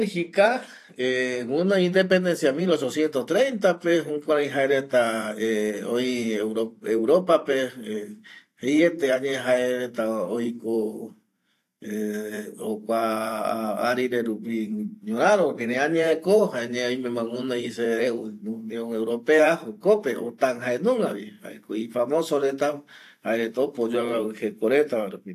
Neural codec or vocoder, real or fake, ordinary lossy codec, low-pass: vocoder, 44.1 kHz, 128 mel bands every 512 samples, BigVGAN v2; fake; AAC, 64 kbps; 14.4 kHz